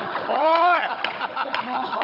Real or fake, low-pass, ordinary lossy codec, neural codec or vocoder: fake; 5.4 kHz; none; codec, 16 kHz, 16 kbps, FunCodec, trained on Chinese and English, 50 frames a second